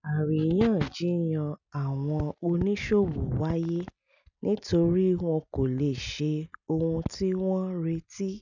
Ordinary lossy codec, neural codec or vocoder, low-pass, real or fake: none; none; 7.2 kHz; real